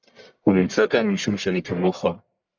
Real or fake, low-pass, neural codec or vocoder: fake; 7.2 kHz; codec, 44.1 kHz, 1.7 kbps, Pupu-Codec